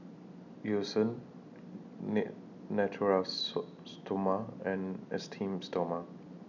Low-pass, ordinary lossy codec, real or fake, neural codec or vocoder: 7.2 kHz; none; real; none